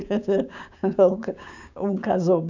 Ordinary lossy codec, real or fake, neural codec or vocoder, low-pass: none; fake; codec, 16 kHz, 6 kbps, DAC; 7.2 kHz